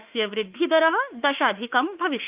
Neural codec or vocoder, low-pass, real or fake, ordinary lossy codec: autoencoder, 48 kHz, 32 numbers a frame, DAC-VAE, trained on Japanese speech; 3.6 kHz; fake; Opus, 24 kbps